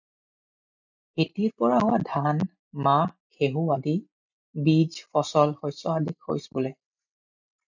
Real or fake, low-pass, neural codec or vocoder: real; 7.2 kHz; none